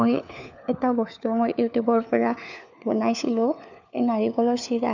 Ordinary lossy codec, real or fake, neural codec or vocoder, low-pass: none; fake; codec, 16 kHz, 4 kbps, FunCodec, trained on LibriTTS, 50 frames a second; 7.2 kHz